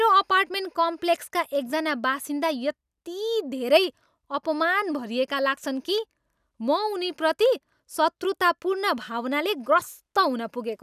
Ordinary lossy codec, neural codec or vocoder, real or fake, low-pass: none; none; real; 14.4 kHz